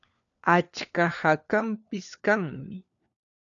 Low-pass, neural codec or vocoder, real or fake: 7.2 kHz; codec, 16 kHz, 4 kbps, FunCodec, trained on LibriTTS, 50 frames a second; fake